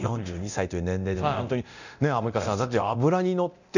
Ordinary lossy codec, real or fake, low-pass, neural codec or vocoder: none; fake; 7.2 kHz; codec, 24 kHz, 0.9 kbps, DualCodec